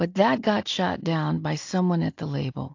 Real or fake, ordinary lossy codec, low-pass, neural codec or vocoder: real; AAC, 48 kbps; 7.2 kHz; none